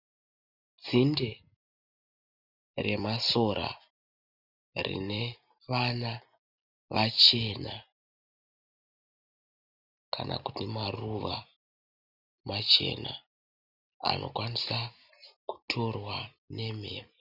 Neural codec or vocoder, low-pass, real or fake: none; 5.4 kHz; real